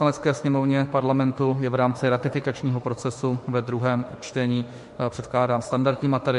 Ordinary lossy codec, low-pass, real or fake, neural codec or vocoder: MP3, 48 kbps; 14.4 kHz; fake; autoencoder, 48 kHz, 32 numbers a frame, DAC-VAE, trained on Japanese speech